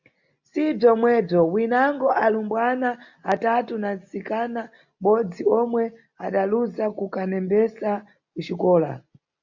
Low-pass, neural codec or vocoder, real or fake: 7.2 kHz; none; real